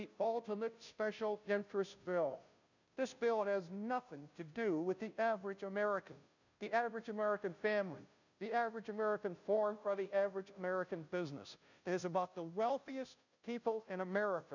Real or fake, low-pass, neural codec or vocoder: fake; 7.2 kHz; codec, 16 kHz, 0.5 kbps, FunCodec, trained on Chinese and English, 25 frames a second